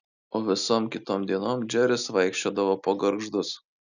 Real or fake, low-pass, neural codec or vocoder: real; 7.2 kHz; none